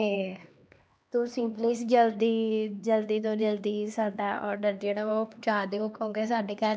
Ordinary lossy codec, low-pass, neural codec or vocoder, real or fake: none; none; codec, 16 kHz, 2 kbps, X-Codec, HuBERT features, trained on LibriSpeech; fake